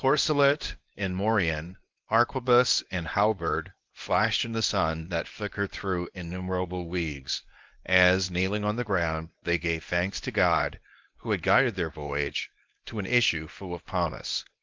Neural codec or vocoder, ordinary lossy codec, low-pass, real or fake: codec, 24 kHz, 0.9 kbps, WavTokenizer, medium speech release version 1; Opus, 24 kbps; 7.2 kHz; fake